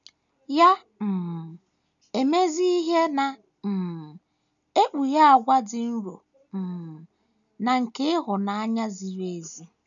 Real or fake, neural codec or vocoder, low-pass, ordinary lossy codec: real; none; 7.2 kHz; none